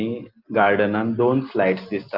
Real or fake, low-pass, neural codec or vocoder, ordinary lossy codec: real; 5.4 kHz; none; Opus, 32 kbps